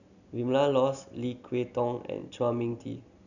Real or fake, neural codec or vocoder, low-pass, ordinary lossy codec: real; none; 7.2 kHz; none